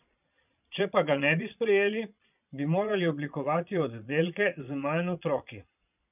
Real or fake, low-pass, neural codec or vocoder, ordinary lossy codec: fake; 3.6 kHz; vocoder, 22.05 kHz, 80 mel bands, Vocos; none